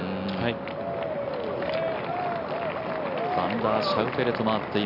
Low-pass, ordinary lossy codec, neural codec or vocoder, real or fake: 5.4 kHz; none; none; real